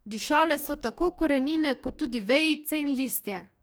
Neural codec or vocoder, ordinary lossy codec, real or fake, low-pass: codec, 44.1 kHz, 2.6 kbps, DAC; none; fake; none